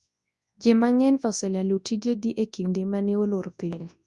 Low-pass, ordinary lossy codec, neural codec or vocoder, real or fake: 10.8 kHz; Opus, 64 kbps; codec, 24 kHz, 0.9 kbps, WavTokenizer, large speech release; fake